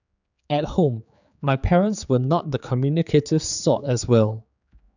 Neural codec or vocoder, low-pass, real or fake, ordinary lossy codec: codec, 16 kHz, 4 kbps, X-Codec, HuBERT features, trained on general audio; 7.2 kHz; fake; none